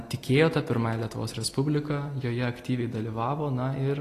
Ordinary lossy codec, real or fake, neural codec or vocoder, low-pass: AAC, 48 kbps; real; none; 14.4 kHz